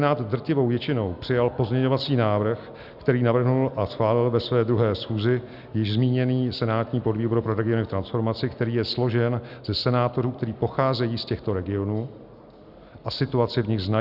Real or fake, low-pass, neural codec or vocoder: real; 5.4 kHz; none